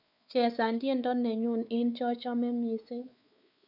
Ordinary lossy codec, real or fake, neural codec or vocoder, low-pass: none; fake; codec, 16 kHz, 4 kbps, X-Codec, WavLM features, trained on Multilingual LibriSpeech; 5.4 kHz